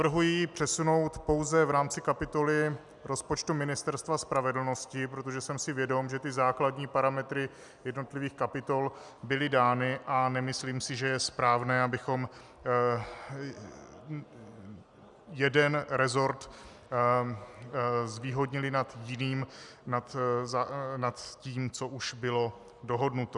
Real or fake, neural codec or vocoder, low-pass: real; none; 10.8 kHz